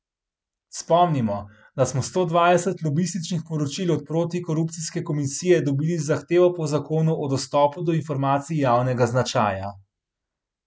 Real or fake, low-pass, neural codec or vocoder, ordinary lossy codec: real; none; none; none